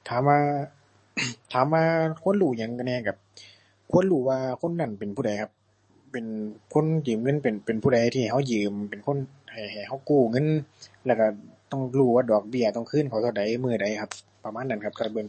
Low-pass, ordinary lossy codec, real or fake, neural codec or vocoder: 10.8 kHz; MP3, 32 kbps; fake; codec, 44.1 kHz, 7.8 kbps, DAC